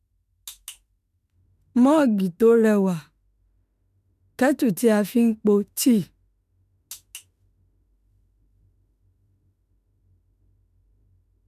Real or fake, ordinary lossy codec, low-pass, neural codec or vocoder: fake; none; 14.4 kHz; autoencoder, 48 kHz, 32 numbers a frame, DAC-VAE, trained on Japanese speech